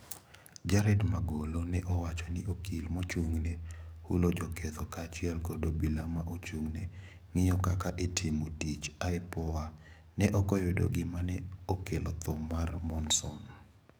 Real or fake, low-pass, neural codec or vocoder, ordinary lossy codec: fake; none; codec, 44.1 kHz, 7.8 kbps, DAC; none